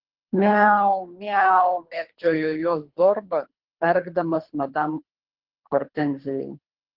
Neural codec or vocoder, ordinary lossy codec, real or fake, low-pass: codec, 24 kHz, 3 kbps, HILCodec; Opus, 16 kbps; fake; 5.4 kHz